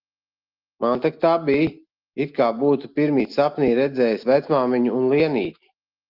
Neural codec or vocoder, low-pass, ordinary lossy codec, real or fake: none; 5.4 kHz; Opus, 24 kbps; real